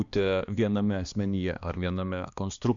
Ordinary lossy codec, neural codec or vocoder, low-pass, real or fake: Opus, 64 kbps; codec, 16 kHz, 2 kbps, X-Codec, HuBERT features, trained on balanced general audio; 7.2 kHz; fake